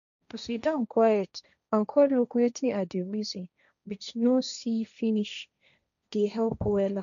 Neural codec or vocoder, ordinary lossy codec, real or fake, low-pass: codec, 16 kHz, 1.1 kbps, Voila-Tokenizer; none; fake; 7.2 kHz